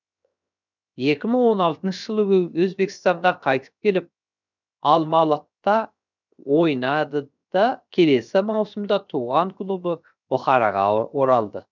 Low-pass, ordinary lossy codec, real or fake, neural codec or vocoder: 7.2 kHz; none; fake; codec, 16 kHz, 0.7 kbps, FocalCodec